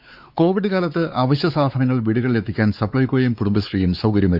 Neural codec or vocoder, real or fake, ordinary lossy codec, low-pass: codec, 16 kHz, 4 kbps, X-Codec, WavLM features, trained on Multilingual LibriSpeech; fake; Opus, 64 kbps; 5.4 kHz